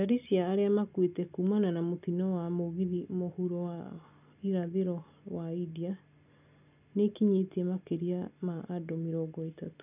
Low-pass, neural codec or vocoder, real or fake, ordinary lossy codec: 3.6 kHz; none; real; none